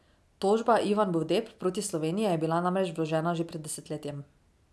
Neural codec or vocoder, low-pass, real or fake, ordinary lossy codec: none; none; real; none